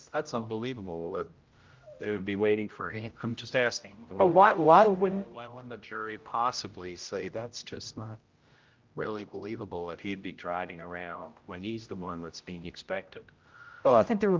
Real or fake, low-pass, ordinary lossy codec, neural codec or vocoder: fake; 7.2 kHz; Opus, 24 kbps; codec, 16 kHz, 0.5 kbps, X-Codec, HuBERT features, trained on general audio